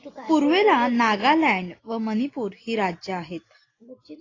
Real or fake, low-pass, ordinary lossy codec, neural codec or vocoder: real; 7.2 kHz; AAC, 32 kbps; none